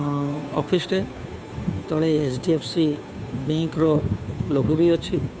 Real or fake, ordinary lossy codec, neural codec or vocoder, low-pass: fake; none; codec, 16 kHz, 2 kbps, FunCodec, trained on Chinese and English, 25 frames a second; none